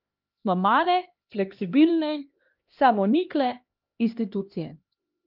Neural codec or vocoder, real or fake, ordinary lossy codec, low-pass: codec, 16 kHz, 1 kbps, X-Codec, HuBERT features, trained on LibriSpeech; fake; Opus, 24 kbps; 5.4 kHz